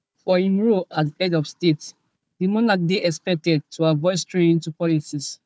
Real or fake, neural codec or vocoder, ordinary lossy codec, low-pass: fake; codec, 16 kHz, 4 kbps, FunCodec, trained on Chinese and English, 50 frames a second; none; none